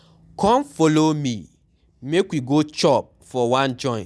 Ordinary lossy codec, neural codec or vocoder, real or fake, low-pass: none; none; real; none